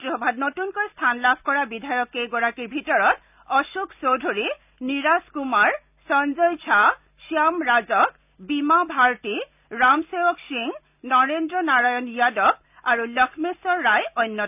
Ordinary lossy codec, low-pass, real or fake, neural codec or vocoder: none; 3.6 kHz; real; none